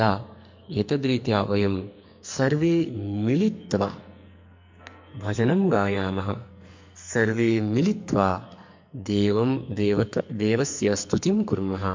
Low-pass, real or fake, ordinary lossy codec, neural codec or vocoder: 7.2 kHz; fake; MP3, 48 kbps; codec, 44.1 kHz, 2.6 kbps, SNAC